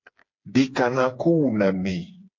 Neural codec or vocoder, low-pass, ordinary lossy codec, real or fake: codec, 16 kHz, 4 kbps, FreqCodec, smaller model; 7.2 kHz; MP3, 48 kbps; fake